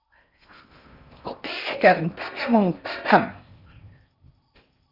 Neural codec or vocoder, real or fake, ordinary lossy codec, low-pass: codec, 16 kHz in and 24 kHz out, 0.6 kbps, FocalCodec, streaming, 4096 codes; fake; Opus, 64 kbps; 5.4 kHz